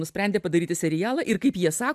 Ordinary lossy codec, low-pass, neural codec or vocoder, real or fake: AAC, 96 kbps; 14.4 kHz; none; real